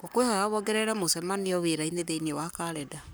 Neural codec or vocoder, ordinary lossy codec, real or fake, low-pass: codec, 44.1 kHz, 7.8 kbps, Pupu-Codec; none; fake; none